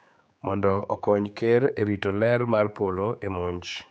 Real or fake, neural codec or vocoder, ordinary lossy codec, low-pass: fake; codec, 16 kHz, 4 kbps, X-Codec, HuBERT features, trained on general audio; none; none